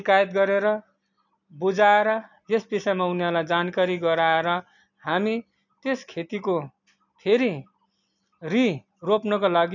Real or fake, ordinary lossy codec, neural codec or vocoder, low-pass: real; none; none; 7.2 kHz